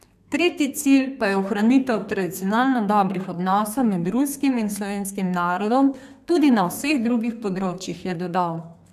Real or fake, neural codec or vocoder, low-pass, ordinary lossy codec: fake; codec, 32 kHz, 1.9 kbps, SNAC; 14.4 kHz; none